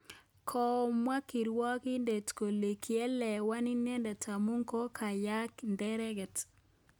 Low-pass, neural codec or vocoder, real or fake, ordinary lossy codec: none; none; real; none